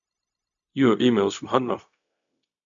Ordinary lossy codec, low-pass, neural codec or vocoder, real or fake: none; 7.2 kHz; codec, 16 kHz, 0.4 kbps, LongCat-Audio-Codec; fake